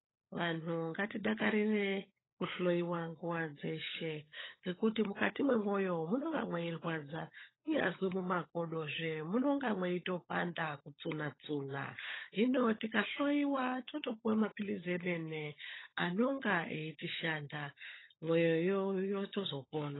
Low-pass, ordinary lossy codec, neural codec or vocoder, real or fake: 7.2 kHz; AAC, 16 kbps; codec, 16 kHz, 16 kbps, FunCodec, trained on LibriTTS, 50 frames a second; fake